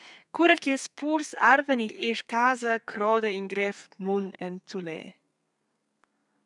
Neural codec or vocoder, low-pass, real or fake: codec, 32 kHz, 1.9 kbps, SNAC; 10.8 kHz; fake